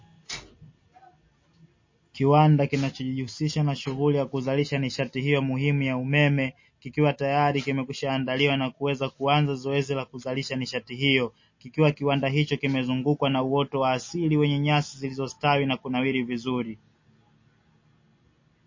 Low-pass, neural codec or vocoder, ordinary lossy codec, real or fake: 7.2 kHz; none; MP3, 32 kbps; real